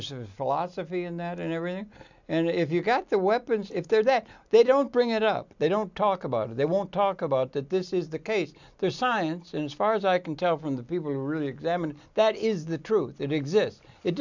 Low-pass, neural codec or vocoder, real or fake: 7.2 kHz; none; real